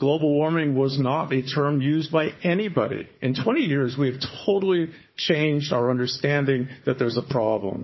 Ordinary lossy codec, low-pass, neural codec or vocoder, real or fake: MP3, 24 kbps; 7.2 kHz; codec, 16 kHz, 4 kbps, FunCodec, trained on Chinese and English, 50 frames a second; fake